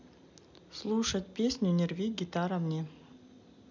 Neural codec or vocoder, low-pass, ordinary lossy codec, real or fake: none; 7.2 kHz; none; real